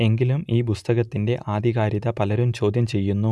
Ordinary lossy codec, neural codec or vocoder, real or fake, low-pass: none; none; real; none